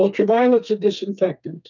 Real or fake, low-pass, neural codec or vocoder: fake; 7.2 kHz; codec, 32 kHz, 1.9 kbps, SNAC